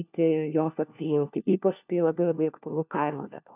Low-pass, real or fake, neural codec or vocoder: 3.6 kHz; fake; codec, 16 kHz, 1 kbps, FunCodec, trained on LibriTTS, 50 frames a second